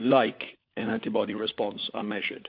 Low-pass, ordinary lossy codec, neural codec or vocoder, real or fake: 5.4 kHz; MP3, 48 kbps; codec, 16 kHz, 4 kbps, FunCodec, trained on LibriTTS, 50 frames a second; fake